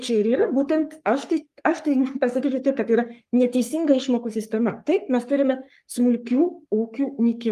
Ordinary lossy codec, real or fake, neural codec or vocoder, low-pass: Opus, 32 kbps; fake; codec, 44.1 kHz, 3.4 kbps, Pupu-Codec; 14.4 kHz